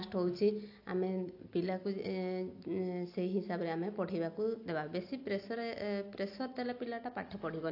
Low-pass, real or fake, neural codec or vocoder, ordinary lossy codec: 5.4 kHz; real; none; AAC, 32 kbps